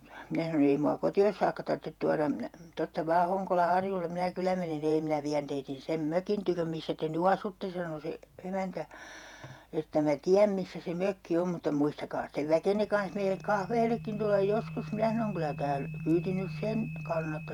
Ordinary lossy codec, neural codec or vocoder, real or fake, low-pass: none; vocoder, 48 kHz, 128 mel bands, Vocos; fake; 19.8 kHz